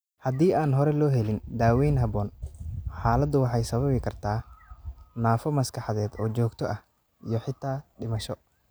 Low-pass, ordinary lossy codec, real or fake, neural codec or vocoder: none; none; real; none